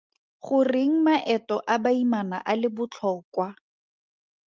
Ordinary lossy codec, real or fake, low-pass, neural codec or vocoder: Opus, 32 kbps; real; 7.2 kHz; none